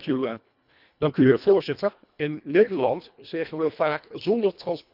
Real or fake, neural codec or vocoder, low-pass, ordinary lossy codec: fake; codec, 24 kHz, 1.5 kbps, HILCodec; 5.4 kHz; none